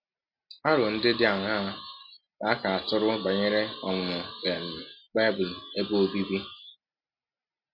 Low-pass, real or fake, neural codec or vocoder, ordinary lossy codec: 5.4 kHz; real; none; MP3, 32 kbps